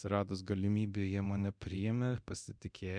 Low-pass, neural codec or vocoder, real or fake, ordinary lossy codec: 9.9 kHz; codec, 24 kHz, 0.9 kbps, DualCodec; fake; MP3, 96 kbps